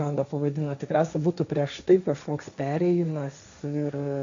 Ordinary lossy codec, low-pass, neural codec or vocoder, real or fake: AAC, 64 kbps; 7.2 kHz; codec, 16 kHz, 1.1 kbps, Voila-Tokenizer; fake